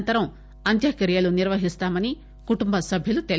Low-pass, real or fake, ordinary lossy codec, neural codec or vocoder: none; real; none; none